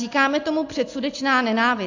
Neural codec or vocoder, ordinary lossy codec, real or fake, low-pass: none; AAC, 48 kbps; real; 7.2 kHz